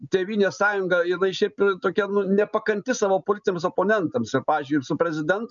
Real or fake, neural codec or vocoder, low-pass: real; none; 7.2 kHz